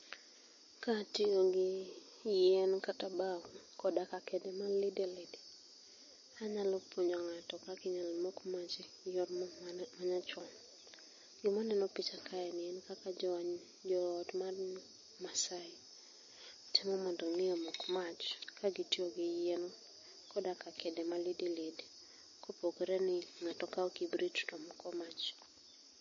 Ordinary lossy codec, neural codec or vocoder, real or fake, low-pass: MP3, 32 kbps; none; real; 7.2 kHz